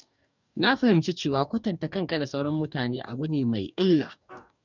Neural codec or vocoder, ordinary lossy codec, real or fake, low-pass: codec, 44.1 kHz, 2.6 kbps, DAC; none; fake; 7.2 kHz